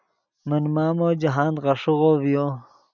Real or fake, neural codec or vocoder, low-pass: real; none; 7.2 kHz